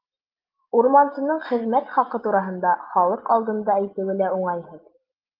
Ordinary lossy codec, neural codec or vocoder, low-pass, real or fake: Opus, 32 kbps; none; 5.4 kHz; real